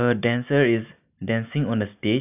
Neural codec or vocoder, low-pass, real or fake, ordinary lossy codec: none; 3.6 kHz; real; none